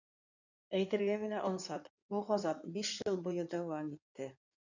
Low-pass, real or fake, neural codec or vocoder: 7.2 kHz; fake; codec, 16 kHz in and 24 kHz out, 2.2 kbps, FireRedTTS-2 codec